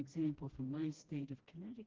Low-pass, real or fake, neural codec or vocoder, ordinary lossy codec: 7.2 kHz; fake; codec, 16 kHz, 1 kbps, FreqCodec, smaller model; Opus, 16 kbps